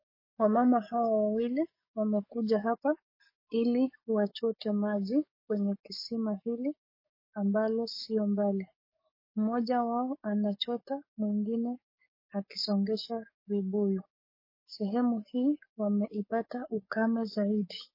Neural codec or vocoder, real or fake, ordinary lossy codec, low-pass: codec, 44.1 kHz, 7.8 kbps, DAC; fake; MP3, 24 kbps; 5.4 kHz